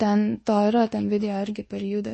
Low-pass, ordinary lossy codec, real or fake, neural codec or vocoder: 10.8 kHz; MP3, 32 kbps; fake; codec, 24 kHz, 1.2 kbps, DualCodec